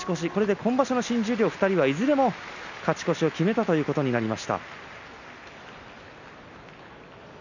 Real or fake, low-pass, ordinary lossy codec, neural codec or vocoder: real; 7.2 kHz; none; none